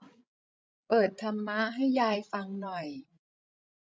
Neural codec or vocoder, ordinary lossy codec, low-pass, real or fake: codec, 16 kHz, 16 kbps, FreqCodec, larger model; none; none; fake